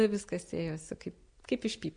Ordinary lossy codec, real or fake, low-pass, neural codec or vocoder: MP3, 64 kbps; real; 9.9 kHz; none